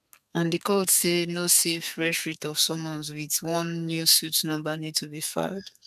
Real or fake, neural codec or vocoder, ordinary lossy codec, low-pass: fake; codec, 32 kHz, 1.9 kbps, SNAC; none; 14.4 kHz